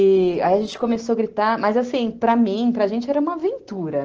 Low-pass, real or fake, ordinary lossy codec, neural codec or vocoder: 7.2 kHz; real; Opus, 16 kbps; none